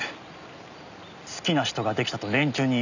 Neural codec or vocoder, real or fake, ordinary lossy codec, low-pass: none; real; none; 7.2 kHz